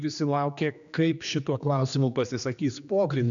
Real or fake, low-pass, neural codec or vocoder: fake; 7.2 kHz; codec, 16 kHz, 2 kbps, X-Codec, HuBERT features, trained on general audio